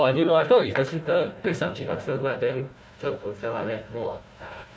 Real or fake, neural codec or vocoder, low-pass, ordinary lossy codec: fake; codec, 16 kHz, 1 kbps, FunCodec, trained on Chinese and English, 50 frames a second; none; none